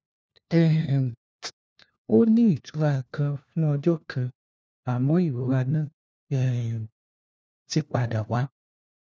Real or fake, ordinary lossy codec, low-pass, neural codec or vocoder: fake; none; none; codec, 16 kHz, 1 kbps, FunCodec, trained on LibriTTS, 50 frames a second